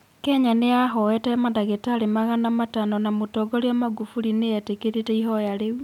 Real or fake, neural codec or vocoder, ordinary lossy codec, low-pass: real; none; none; 19.8 kHz